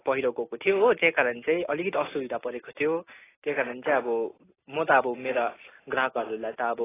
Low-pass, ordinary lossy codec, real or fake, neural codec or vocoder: 3.6 kHz; AAC, 16 kbps; real; none